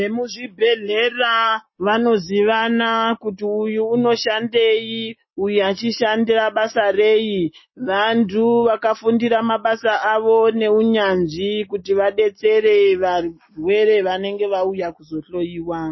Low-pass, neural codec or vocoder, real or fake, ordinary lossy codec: 7.2 kHz; none; real; MP3, 24 kbps